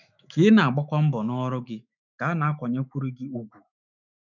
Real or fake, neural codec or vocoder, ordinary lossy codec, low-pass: fake; autoencoder, 48 kHz, 128 numbers a frame, DAC-VAE, trained on Japanese speech; none; 7.2 kHz